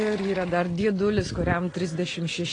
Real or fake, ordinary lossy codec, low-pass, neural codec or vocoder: real; AAC, 32 kbps; 9.9 kHz; none